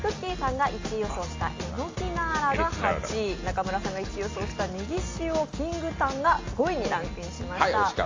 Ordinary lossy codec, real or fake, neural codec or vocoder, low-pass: AAC, 32 kbps; real; none; 7.2 kHz